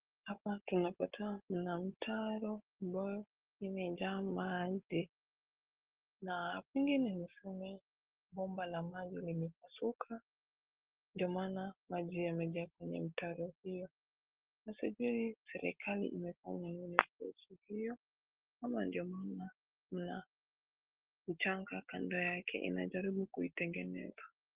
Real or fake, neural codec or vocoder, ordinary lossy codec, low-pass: real; none; Opus, 16 kbps; 3.6 kHz